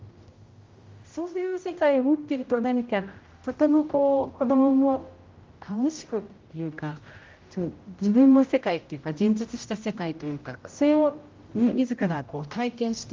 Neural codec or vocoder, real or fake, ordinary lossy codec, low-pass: codec, 16 kHz, 0.5 kbps, X-Codec, HuBERT features, trained on general audio; fake; Opus, 32 kbps; 7.2 kHz